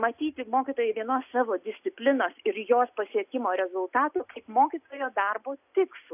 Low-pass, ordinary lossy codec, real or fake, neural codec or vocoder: 3.6 kHz; AAC, 32 kbps; real; none